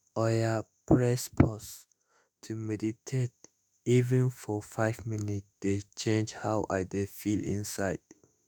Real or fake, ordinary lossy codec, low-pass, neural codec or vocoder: fake; none; none; autoencoder, 48 kHz, 32 numbers a frame, DAC-VAE, trained on Japanese speech